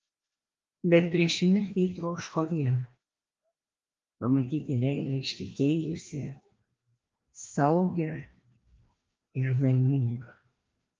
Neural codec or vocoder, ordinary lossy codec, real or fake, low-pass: codec, 16 kHz, 1 kbps, FreqCodec, larger model; Opus, 32 kbps; fake; 7.2 kHz